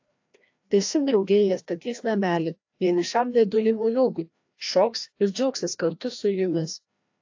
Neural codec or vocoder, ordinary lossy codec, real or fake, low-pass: codec, 16 kHz, 1 kbps, FreqCodec, larger model; AAC, 48 kbps; fake; 7.2 kHz